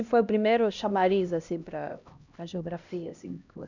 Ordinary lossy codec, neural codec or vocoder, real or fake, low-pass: none; codec, 16 kHz, 1 kbps, X-Codec, HuBERT features, trained on LibriSpeech; fake; 7.2 kHz